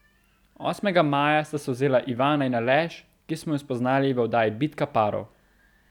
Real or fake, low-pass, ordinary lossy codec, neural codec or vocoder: real; 19.8 kHz; none; none